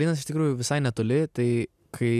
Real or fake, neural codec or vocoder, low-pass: real; none; 14.4 kHz